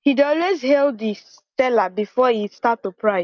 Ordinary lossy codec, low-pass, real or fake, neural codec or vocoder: none; 7.2 kHz; real; none